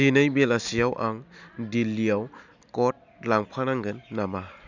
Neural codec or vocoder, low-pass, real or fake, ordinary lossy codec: none; 7.2 kHz; real; none